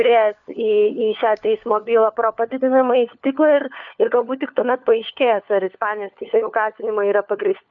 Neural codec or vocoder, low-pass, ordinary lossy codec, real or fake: codec, 16 kHz, 4 kbps, FunCodec, trained on LibriTTS, 50 frames a second; 7.2 kHz; MP3, 96 kbps; fake